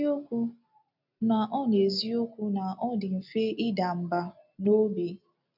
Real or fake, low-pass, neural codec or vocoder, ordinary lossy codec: real; 5.4 kHz; none; none